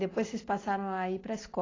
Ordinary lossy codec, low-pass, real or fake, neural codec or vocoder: AAC, 32 kbps; 7.2 kHz; real; none